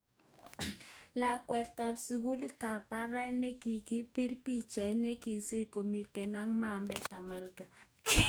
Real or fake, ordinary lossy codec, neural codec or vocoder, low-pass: fake; none; codec, 44.1 kHz, 2.6 kbps, DAC; none